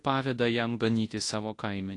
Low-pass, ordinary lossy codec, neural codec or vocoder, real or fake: 10.8 kHz; AAC, 48 kbps; codec, 24 kHz, 0.9 kbps, WavTokenizer, large speech release; fake